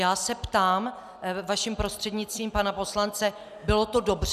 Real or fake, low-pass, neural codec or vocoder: real; 14.4 kHz; none